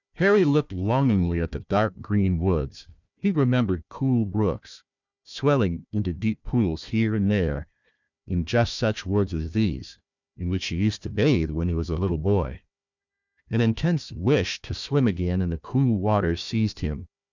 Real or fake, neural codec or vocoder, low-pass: fake; codec, 16 kHz, 1 kbps, FunCodec, trained on Chinese and English, 50 frames a second; 7.2 kHz